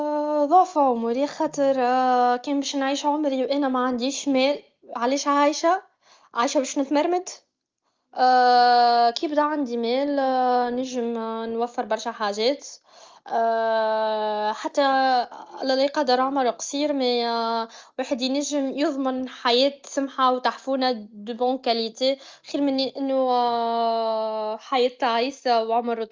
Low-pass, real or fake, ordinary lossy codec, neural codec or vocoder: 7.2 kHz; real; Opus, 32 kbps; none